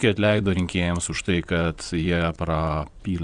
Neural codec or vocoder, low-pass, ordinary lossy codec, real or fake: vocoder, 22.05 kHz, 80 mel bands, WaveNeXt; 9.9 kHz; Opus, 64 kbps; fake